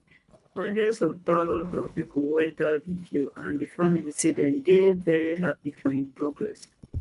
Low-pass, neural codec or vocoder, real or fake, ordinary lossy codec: 10.8 kHz; codec, 24 kHz, 1.5 kbps, HILCodec; fake; none